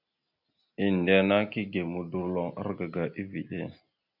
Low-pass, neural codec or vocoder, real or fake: 5.4 kHz; none; real